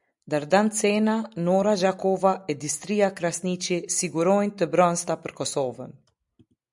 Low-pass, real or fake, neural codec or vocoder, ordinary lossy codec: 10.8 kHz; real; none; MP3, 96 kbps